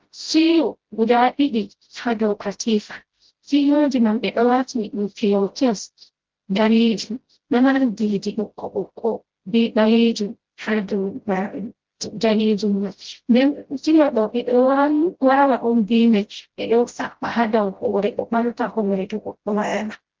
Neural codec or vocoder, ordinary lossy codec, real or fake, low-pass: codec, 16 kHz, 0.5 kbps, FreqCodec, smaller model; Opus, 16 kbps; fake; 7.2 kHz